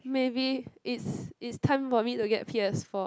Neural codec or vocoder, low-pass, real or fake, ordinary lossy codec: none; none; real; none